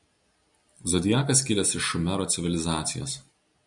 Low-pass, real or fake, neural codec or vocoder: 10.8 kHz; real; none